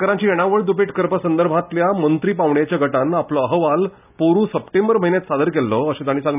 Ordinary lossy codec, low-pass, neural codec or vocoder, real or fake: none; 3.6 kHz; none; real